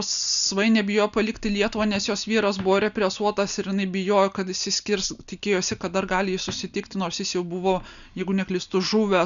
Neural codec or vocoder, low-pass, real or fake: none; 7.2 kHz; real